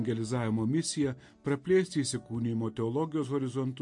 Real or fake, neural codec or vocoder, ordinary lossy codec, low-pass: real; none; MP3, 48 kbps; 10.8 kHz